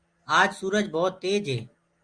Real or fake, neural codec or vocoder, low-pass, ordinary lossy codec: real; none; 9.9 kHz; Opus, 32 kbps